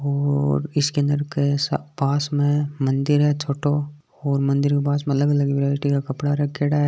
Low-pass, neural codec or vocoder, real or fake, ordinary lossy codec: none; none; real; none